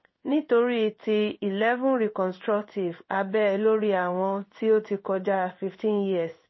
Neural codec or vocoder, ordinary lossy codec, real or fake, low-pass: codec, 16 kHz in and 24 kHz out, 1 kbps, XY-Tokenizer; MP3, 24 kbps; fake; 7.2 kHz